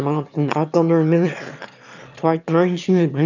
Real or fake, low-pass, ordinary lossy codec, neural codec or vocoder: fake; 7.2 kHz; none; autoencoder, 22.05 kHz, a latent of 192 numbers a frame, VITS, trained on one speaker